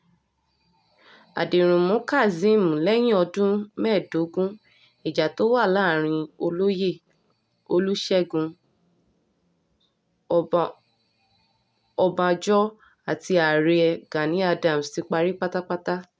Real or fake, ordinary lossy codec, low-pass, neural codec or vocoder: real; none; none; none